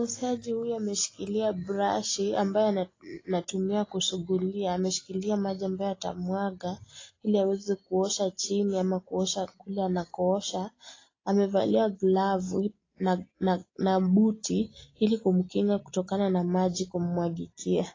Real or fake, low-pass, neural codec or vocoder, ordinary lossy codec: real; 7.2 kHz; none; AAC, 32 kbps